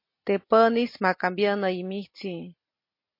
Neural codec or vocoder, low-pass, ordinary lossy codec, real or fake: none; 5.4 kHz; MP3, 32 kbps; real